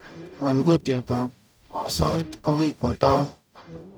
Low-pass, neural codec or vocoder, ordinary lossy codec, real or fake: none; codec, 44.1 kHz, 0.9 kbps, DAC; none; fake